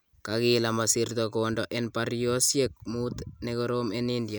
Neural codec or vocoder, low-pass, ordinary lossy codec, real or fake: none; none; none; real